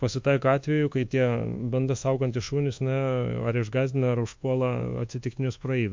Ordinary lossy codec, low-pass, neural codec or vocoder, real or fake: MP3, 48 kbps; 7.2 kHz; codec, 24 kHz, 1.2 kbps, DualCodec; fake